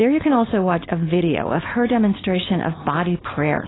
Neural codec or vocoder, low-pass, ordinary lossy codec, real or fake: codec, 16 kHz, 4.8 kbps, FACodec; 7.2 kHz; AAC, 16 kbps; fake